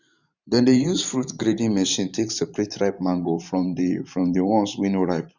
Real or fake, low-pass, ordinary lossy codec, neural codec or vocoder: fake; 7.2 kHz; none; vocoder, 44.1 kHz, 128 mel bands every 512 samples, BigVGAN v2